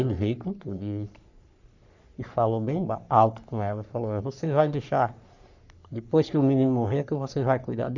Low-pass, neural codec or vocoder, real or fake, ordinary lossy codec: 7.2 kHz; codec, 44.1 kHz, 3.4 kbps, Pupu-Codec; fake; none